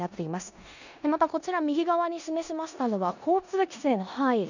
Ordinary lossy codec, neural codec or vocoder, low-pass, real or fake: none; codec, 16 kHz in and 24 kHz out, 0.9 kbps, LongCat-Audio-Codec, four codebook decoder; 7.2 kHz; fake